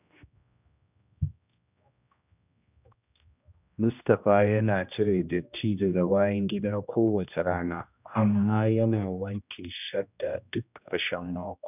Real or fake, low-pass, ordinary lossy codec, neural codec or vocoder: fake; 3.6 kHz; none; codec, 16 kHz, 1 kbps, X-Codec, HuBERT features, trained on general audio